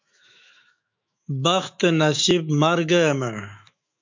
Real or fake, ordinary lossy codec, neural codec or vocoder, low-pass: fake; MP3, 64 kbps; autoencoder, 48 kHz, 128 numbers a frame, DAC-VAE, trained on Japanese speech; 7.2 kHz